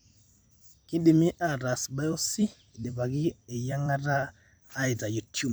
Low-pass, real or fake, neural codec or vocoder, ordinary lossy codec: none; fake; vocoder, 44.1 kHz, 128 mel bands every 256 samples, BigVGAN v2; none